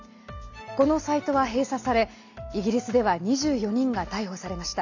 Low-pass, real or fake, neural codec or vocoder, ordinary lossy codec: 7.2 kHz; real; none; none